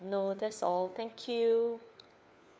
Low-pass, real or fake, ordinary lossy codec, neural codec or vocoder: none; fake; none; codec, 16 kHz, 4 kbps, FunCodec, trained on LibriTTS, 50 frames a second